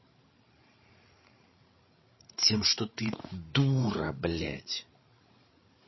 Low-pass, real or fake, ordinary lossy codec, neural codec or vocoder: 7.2 kHz; fake; MP3, 24 kbps; codec, 16 kHz, 8 kbps, FreqCodec, larger model